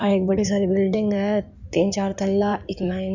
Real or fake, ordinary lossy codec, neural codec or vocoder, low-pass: fake; none; codec, 16 kHz in and 24 kHz out, 2.2 kbps, FireRedTTS-2 codec; 7.2 kHz